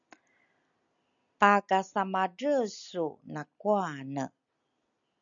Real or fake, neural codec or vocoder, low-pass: real; none; 7.2 kHz